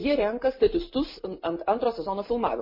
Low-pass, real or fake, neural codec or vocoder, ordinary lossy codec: 5.4 kHz; real; none; MP3, 24 kbps